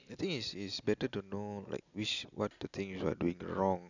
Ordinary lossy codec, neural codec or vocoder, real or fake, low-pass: none; none; real; 7.2 kHz